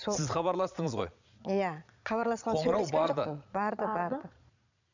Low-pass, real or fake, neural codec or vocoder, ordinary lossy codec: 7.2 kHz; fake; vocoder, 22.05 kHz, 80 mel bands, Vocos; none